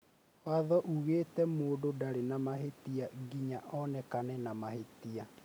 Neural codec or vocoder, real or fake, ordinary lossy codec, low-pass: none; real; none; none